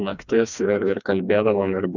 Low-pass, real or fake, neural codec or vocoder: 7.2 kHz; fake; codec, 16 kHz, 2 kbps, FreqCodec, smaller model